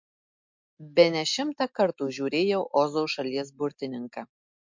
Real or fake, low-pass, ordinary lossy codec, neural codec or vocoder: real; 7.2 kHz; MP3, 48 kbps; none